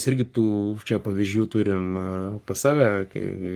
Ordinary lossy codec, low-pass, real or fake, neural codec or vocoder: Opus, 32 kbps; 14.4 kHz; fake; codec, 44.1 kHz, 3.4 kbps, Pupu-Codec